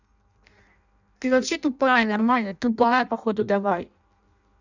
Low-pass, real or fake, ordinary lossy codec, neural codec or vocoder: 7.2 kHz; fake; none; codec, 16 kHz in and 24 kHz out, 0.6 kbps, FireRedTTS-2 codec